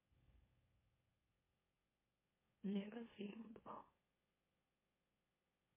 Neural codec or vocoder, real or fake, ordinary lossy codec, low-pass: autoencoder, 44.1 kHz, a latent of 192 numbers a frame, MeloTTS; fake; MP3, 16 kbps; 3.6 kHz